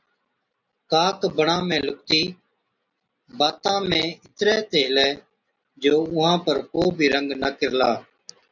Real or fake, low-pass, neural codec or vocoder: real; 7.2 kHz; none